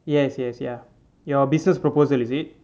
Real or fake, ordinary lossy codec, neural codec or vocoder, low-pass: real; none; none; none